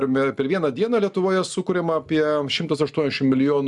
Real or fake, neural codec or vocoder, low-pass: real; none; 10.8 kHz